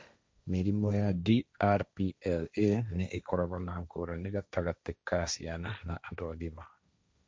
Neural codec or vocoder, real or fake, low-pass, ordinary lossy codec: codec, 16 kHz, 1.1 kbps, Voila-Tokenizer; fake; none; none